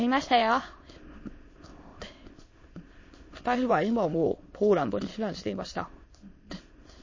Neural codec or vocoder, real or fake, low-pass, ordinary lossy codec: autoencoder, 22.05 kHz, a latent of 192 numbers a frame, VITS, trained on many speakers; fake; 7.2 kHz; MP3, 32 kbps